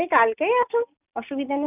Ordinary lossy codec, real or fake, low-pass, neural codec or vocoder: none; real; 3.6 kHz; none